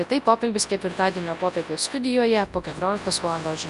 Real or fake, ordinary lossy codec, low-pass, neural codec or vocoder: fake; Opus, 64 kbps; 10.8 kHz; codec, 24 kHz, 0.9 kbps, WavTokenizer, large speech release